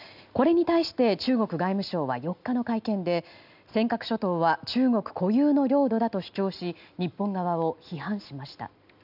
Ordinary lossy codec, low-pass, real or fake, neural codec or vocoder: none; 5.4 kHz; real; none